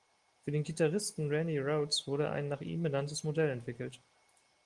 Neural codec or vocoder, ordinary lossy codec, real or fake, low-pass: none; Opus, 24 kbps; real; 10.8 kHz